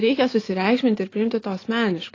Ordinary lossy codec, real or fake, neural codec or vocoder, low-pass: AAC, 32 kbps; real; none; 7.2 kHz